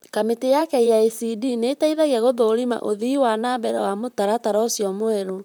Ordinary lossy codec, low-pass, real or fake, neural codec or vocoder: none; none; fake; vocoder, 44.1 kHz, 128 mel bands, Pupu-Vocoder